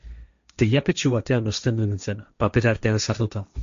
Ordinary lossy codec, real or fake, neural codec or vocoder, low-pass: MP3, 64 kbps; fake; codec, 16 kHz, 1.1 kbps, Voila-Tokenizer; 7.2 kHz